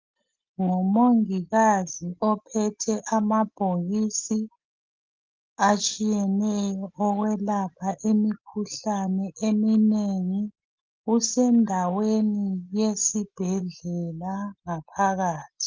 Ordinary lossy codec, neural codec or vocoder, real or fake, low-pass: Opus, 24 kbps; none; real; 7.2 kHz